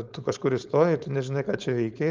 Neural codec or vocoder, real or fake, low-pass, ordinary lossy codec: codec, 16 kHz, 4.8 kbps, FACodec; fake; 7.2 kHz; Opus, 24 kbps